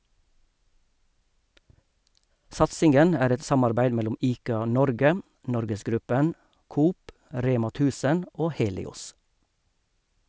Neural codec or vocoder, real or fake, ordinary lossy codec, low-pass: none; real; none; none